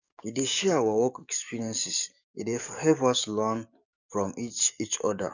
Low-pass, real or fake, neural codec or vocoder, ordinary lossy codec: 7.2 kHz; fake; codec, 44.1 kHz, 7.8 kbps, DAC; none